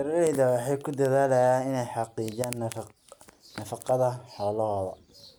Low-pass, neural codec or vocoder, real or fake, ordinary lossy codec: none; none; real; none